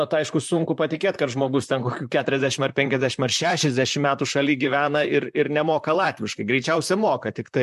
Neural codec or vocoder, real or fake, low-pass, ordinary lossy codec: vocoder, 44.1 kHz, 128 mel bands, Pupu-Vocoder; fake; 14.4 kHz; MP3, 64 kbps